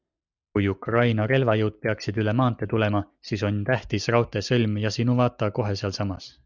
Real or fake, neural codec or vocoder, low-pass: real; none; 7.2 kHz